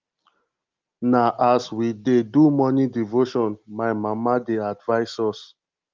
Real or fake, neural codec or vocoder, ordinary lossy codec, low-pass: real; none; Opus, 32 kbps; 7.2 kHz